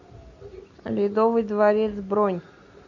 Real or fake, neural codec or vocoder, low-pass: real; none; 7.2 kHz